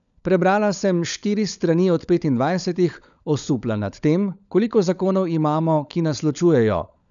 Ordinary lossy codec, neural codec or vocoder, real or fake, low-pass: none; codec, 16 kHz, 16 kbps, FunCodec, trained on LibriTTS, 50 frames a second; fake; 7.2 kHz